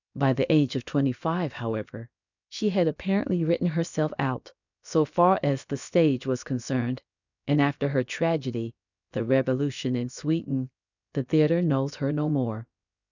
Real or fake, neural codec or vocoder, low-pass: fake; codec, 16 kHz, about 1 kbps, DyCAST, with the encoder's durations; 7.2 kHz